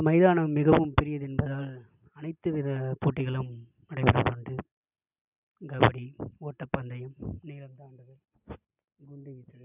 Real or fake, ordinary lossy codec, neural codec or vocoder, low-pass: real; none; none; 3.6 kHz